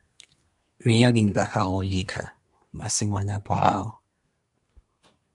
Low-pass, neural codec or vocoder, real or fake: 10.8 kHz; codec, 24 kHz, 1 kbps, SNAC; fake